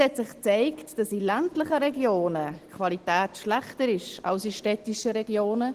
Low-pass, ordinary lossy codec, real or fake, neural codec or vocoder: 14.4 kHz; Opus, 16 kbps; real; none